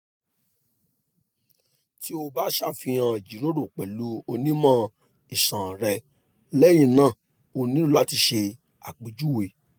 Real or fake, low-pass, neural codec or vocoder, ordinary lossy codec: real; none; none; none